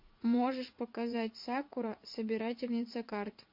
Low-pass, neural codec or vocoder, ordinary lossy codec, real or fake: 5.4 kHz; vocoder, 44.1 kHz, 80 mel bands, Vocos; MP3, 32 kbps; fake